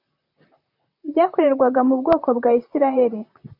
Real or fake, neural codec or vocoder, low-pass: fake; vocoder, 44.1 kHz, 128 mel bands, Pupu-Vocoder; 5.4 kHz